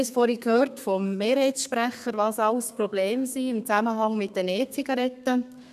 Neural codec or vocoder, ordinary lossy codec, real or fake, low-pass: codec, 32 kHz, 1.9 kbps, SNAC; none; fake; 14.4 kHz